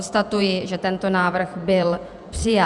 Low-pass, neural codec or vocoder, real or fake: 10.8 kHz; vocoder, 48 kHz, 128 mel bands, Vocos; fake